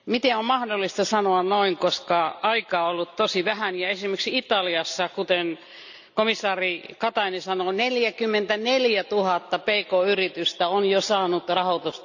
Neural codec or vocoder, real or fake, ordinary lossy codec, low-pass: none; real; none; 7.2 kHz